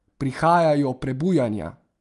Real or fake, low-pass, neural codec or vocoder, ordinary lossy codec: real; 10.8 kHz; none; Opus, 32 kbps